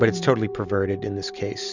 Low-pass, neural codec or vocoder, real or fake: 7.2 kHz; none; real